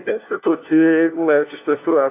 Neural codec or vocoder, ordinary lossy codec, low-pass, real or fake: codec, 16 kHz, 1 kbps, FunCodec, trained on LibriTTS, 50 frames a second; AAC, 24 kbps; 3.6 kHz; fake